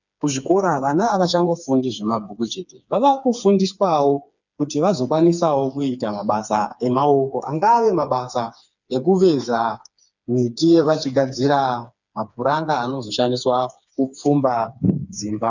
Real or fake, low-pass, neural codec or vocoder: fake; 7.2 kHz; codec, 16 kHz, 4 kbps, FreqCodec, smaller model